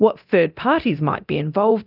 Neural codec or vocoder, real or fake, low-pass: codec, 24 kHz, 0.9 kbps, DualCodec; fake; 5.4 kHz